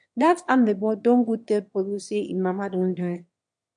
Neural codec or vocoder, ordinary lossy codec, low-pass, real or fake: autoencoder, 22.05 kHz, a latent of 192 numbers a frame, VITS, trained on one speaker; MP3, 64 kbps; 9.9 kHz; fake